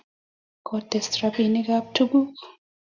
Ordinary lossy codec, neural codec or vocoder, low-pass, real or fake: Opus, 64 kbps; none; 7.2 kHz; real